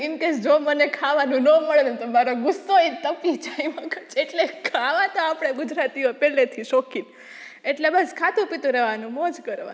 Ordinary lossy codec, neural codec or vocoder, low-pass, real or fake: none; none; none; real